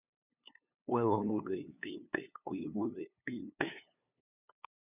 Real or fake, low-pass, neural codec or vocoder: fake; 3.6 kHz; codec, 16 kHz, 2 kbps, FunCodec, trained on LibriTTS, 25 frames a second